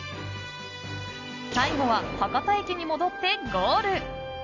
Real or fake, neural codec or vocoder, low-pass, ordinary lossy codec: real; none; 7.2 kHz; none